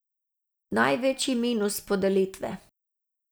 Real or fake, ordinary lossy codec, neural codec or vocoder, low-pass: real; none; none; none